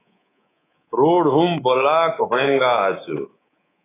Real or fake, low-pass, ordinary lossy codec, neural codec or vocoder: fake; 3.6 kHz; AAC, 16 kbps; codec, 24 kHz, 3.1 kbps, DualCodec